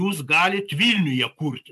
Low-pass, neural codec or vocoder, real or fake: 14.4 kHz; none; real